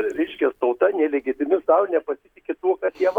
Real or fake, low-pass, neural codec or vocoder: real; 19.8 kHz; none